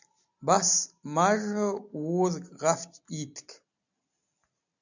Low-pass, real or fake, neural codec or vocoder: 7.2 kHz; real; none